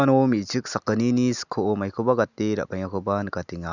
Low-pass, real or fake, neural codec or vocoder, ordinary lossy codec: 7.2 kHz; real; none; none